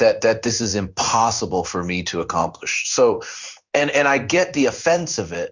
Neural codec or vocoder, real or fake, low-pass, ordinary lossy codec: codec, 16 kHz in and 24 kHz out, 1 kbps, XY-Tokenizer; fake; 7.2 kHz; Opus, 64 kbps